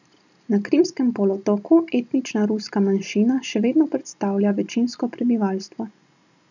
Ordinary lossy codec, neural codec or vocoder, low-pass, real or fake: none; none; 7.2 kHz; real